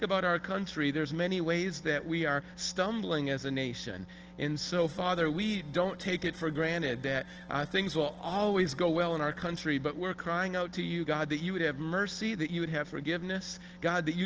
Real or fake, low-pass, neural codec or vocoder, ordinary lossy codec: real; 7.2 kHz; none; Opus, 16 kbps